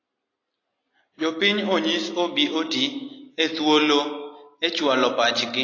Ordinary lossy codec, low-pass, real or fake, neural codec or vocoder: AAC, 32 kbps; 7.2 kHz; real; none